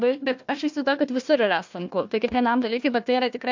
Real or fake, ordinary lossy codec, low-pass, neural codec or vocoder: fake; MP3, 64 kbps; 7.2 kHz; codec, 16 kHz, 1 kbps, FunCodec, trained on Chinese and English, 50 frames a second